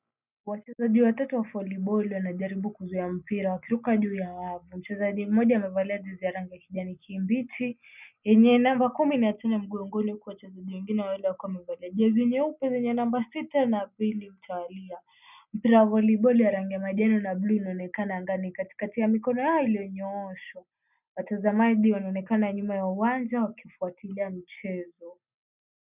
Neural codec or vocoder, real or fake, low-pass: none; real; 3.6 kHz